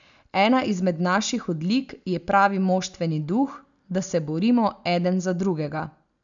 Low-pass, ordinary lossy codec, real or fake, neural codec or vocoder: 7.2 kHz; none; real; none